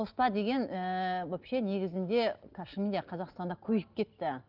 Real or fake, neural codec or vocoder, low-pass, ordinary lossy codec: real; none; 5.4 kHz; Opus, 24 kbps